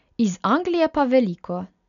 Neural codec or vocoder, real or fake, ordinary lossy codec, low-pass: none; real; none; 7.2 kHz